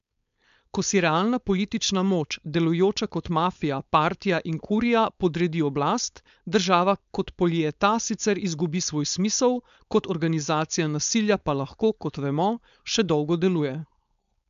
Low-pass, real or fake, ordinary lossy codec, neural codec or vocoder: 7.2 kHz; fake; MP3, 64 kbps; codec, 16 kHz, 4.8 kbps, FACodec